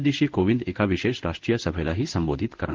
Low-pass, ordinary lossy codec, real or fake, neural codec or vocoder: 7.2 kHz; Opus, 16 kbps; fake; codec, 16 kHz in and 24 kHz out, 1 kbps, XY-Tokenizer